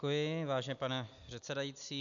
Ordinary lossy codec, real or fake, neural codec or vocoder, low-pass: MP3, 96 kbps; real; none; 7.2 kHz